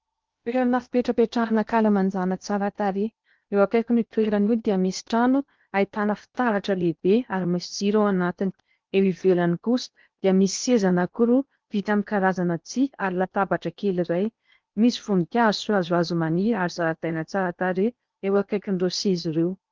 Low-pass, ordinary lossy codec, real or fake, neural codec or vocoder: 7.2 kHz; Opus, 32 kbps; fake; codec, 16 kHz in and 24 kHz out, 0.8 kbps, FocalCodec, streaming, 65536 codes